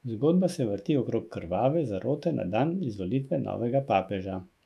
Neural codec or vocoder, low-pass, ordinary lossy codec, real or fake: autoencoder, 48 kHz, 128 numbers a frame, DAC-VAE, trained on Japanese speech; 14.4 kHz; AAC, 96 kbps; fake